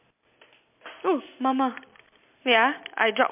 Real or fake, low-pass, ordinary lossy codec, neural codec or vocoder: real; 3.6 kHz; MP3, 32 kbps; none